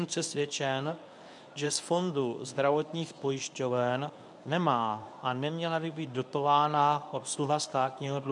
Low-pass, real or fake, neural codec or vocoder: 10.8 kHz; fake; codec, 24 kHz, 0.9 kbps, WavTokenizer, medium speech release version 1